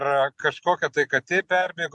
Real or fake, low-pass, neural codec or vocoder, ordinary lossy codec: real; 10.8 kHz; none; MP3, 64 kbps